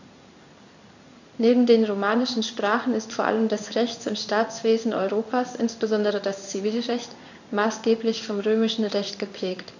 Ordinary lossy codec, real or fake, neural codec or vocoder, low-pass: none; fake; codec, 16 kHz in and 24 kHz out, 1 kbps, XY-Tokenizer; 7.2 kHz